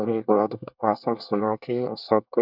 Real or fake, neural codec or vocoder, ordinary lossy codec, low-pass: fake; codec, 24 kHz, 1 kbps, SNAC; none; 5.4 kHz